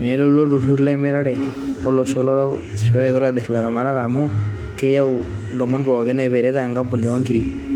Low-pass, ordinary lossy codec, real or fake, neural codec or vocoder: 19.8 kHz; none; fake; autoencoder, 48 kHz, 32 numbers a frame, DAC-VAE, trained on Japanese speech